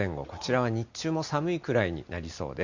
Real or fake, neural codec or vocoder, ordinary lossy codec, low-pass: real; none; Opus, 64 kbps; 7.2 kHz